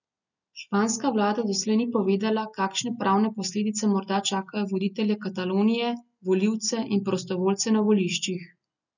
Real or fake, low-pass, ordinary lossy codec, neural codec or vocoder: real; 7.2 kHz; none; none